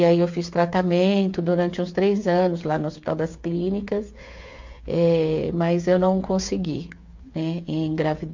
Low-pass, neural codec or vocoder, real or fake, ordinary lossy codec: 7.2 kHz; codec, 16 kHz, 8 kbps, FreqCodec, smaller model; fake; MP3, 48 kbps